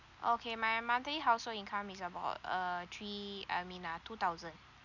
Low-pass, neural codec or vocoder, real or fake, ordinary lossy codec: 7.2 kHz; none; real; none